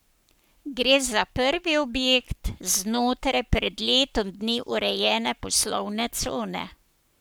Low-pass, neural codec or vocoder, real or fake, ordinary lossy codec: none; codec, 44.1 kHz, 7.8 kbps, Pupu-Codec; fake; none